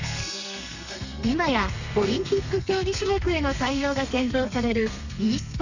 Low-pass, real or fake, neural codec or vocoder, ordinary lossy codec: 7.2 kHz; fake; codec, 32 kHz, 1.9 kbps, SNAC; none